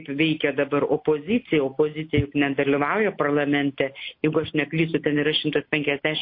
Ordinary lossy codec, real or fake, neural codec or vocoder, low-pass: MP3, 32 kbps; real; none; 5.4 kHz